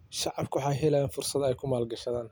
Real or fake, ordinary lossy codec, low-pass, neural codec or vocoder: real; none; none; none